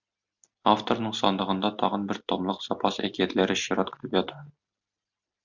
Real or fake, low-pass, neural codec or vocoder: real; 7.2 kHz; none